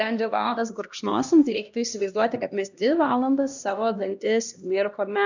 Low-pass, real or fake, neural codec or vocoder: 7.2 kHz; fake; codec, 16 kHz, 1 kbps, X-Codec, HuBERT features, trained on LibriSpeech